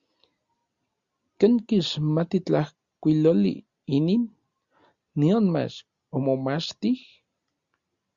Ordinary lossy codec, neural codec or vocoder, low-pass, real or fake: Opus, 64 kbps; none; 7.2 kHz; real